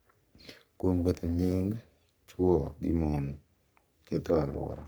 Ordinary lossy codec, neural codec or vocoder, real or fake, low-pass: none; codec, 44.1 kHz, 3.4 kbps, Pupu-Codec; fake; none